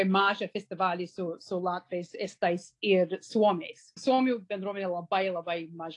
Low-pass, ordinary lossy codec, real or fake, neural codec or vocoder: 10.8 kHz; AAC, 48 kbps; real; none